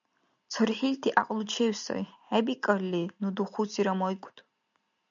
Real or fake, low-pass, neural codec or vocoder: real; 7.2 kHz; none